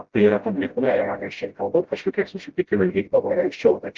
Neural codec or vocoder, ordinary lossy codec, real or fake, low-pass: codec, 16 kHz, 0.5 kbps, FreqCodec, smaller model; Opus, 16 kbps; fake; 7.2 kHz